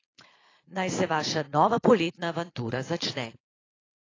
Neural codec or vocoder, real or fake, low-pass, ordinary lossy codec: vocoder, 22.05 kHz, 80 mel bands, Vocos; fake; 7.2 kHz; AAC, 32 kbps